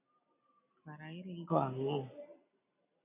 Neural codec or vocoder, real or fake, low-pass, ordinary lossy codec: none; real; 3.6 kHz; MP3, 24 kbps